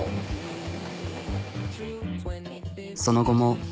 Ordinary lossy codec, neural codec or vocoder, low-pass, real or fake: none; none; none; real